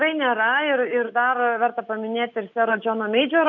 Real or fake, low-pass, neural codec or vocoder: real; 7.2 kHz; none